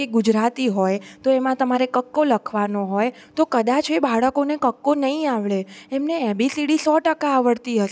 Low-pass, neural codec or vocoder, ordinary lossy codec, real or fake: none; none; none; real